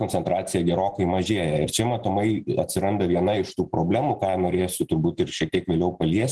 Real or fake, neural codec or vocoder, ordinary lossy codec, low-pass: real; none; Opus, 16 kbps; 10.8 kHz